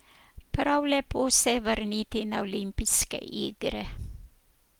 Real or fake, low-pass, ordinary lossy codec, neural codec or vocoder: real; 19.8 kHz; Opus, 24 kbps; none